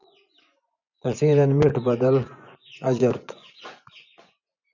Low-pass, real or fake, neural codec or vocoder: 7.2 kHz; fake; vocoder, 24 kHz, 100 mel bands, Vocos